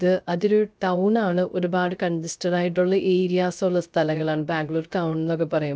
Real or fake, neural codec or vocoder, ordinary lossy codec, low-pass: fake; codec, 16 kHz, 0.3 kbps, FocalCodec; none; none